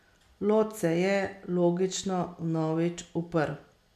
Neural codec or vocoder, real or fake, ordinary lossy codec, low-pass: none; real; none; 14.4 kHz